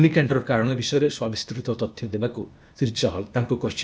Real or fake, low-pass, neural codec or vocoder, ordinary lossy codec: fake; none; codec, 16 kHz, 0.8 kbps, ZipCodec; none